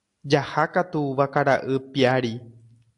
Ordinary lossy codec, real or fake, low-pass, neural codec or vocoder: AAC, 64 kbps; real; 10.8 kHz; none